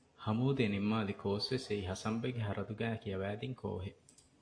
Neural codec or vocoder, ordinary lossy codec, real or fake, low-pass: none; AAC, 48 kbps; real; 9.9 kHz